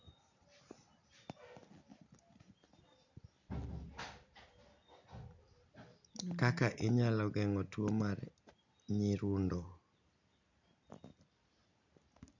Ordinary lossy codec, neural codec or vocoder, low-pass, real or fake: none; none; 7.2 kHz; real